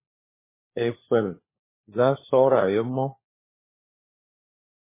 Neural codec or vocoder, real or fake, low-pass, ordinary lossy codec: codec, 16 kHz, 4 kbps, FunCodec, trained on LibriTTS, 50 frames a second; fake; 3.6 kHz; MP3, 16 kbps